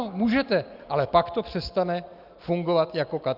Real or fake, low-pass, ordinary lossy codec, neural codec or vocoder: real; 5.4 kHz; Opus, 24 kbps; none